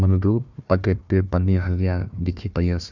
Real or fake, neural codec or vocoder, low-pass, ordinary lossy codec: fake; codec, 16 kHz, 1 kbps, FunCodec, trained on Chinese and English, 50 frames a second; 7.2 kHz; none